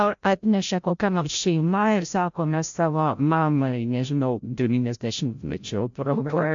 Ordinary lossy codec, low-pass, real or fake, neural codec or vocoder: AAC, 64 kbps; 7.2 kHz; fake; codec, 16 kHz, 0.5 kbps, FreqCodec, larger model